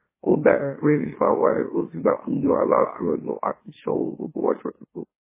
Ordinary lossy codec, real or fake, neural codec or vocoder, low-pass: MP3, 16 kbps; fake; autoencoder, 44.1 kHz, a latent of 192 numbers a frame, MeloTTS; 3.6 kHz